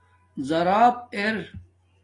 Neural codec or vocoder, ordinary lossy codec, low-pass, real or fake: none; AAC, 32 kbps; 10.8 kHz; real